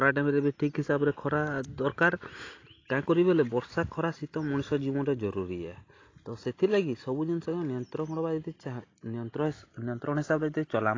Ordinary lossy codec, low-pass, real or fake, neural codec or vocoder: AAC, 32 kbps; 7.2 kHz; real; none